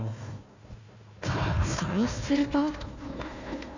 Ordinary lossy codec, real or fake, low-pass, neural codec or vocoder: none; fake; 7.2 kHz; codec, 16 kHz, 1 kbps, FunCodec, trained on Chinese and English, 50 frames a second